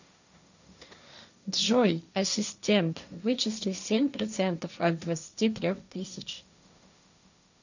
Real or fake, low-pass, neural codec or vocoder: fake; 7.2 kHz; codec, 16 kHz, 1.1 kbps, Voila-Tokenizer